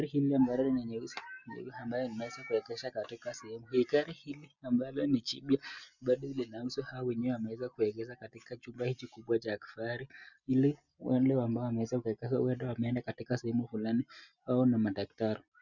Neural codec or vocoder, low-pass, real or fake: none; 7.2 kHz; real